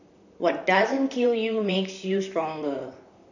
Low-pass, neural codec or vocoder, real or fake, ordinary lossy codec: 7.2 kHz; vocoder, 44.1 kHz, 128 mel bands, Pupu-Vocoder; fake; none